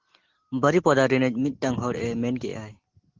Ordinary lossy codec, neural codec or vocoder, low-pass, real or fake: Opus, 16 kbps; none; 7.2 kHz; real